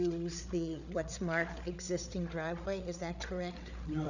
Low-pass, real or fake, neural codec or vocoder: 7.2 kHz; fake; codec, 16 kHz, 8 kbps, FreqCodec, larger model